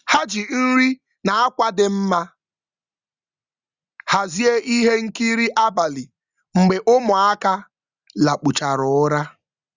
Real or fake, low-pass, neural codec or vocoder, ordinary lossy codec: real; 7.2 kHz; none; Opus, 64 kbps